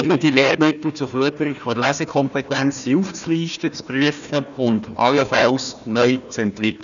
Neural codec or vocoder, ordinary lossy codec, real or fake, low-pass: codec, 16 kHz, 1 kbps, FunCodec, trained on Chinese and English, 50 frames a second; none; fake; 7.2 kHz